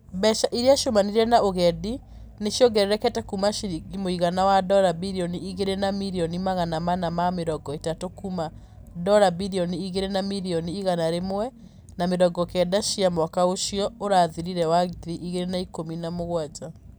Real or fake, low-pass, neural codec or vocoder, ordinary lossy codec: real; none; none; none